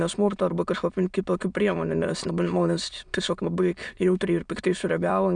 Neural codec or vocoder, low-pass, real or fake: autoencoder, 22.05 kHz, a latent of 192 numbers a frame, VITS, trained on many speakers; 9.9 kHz; fake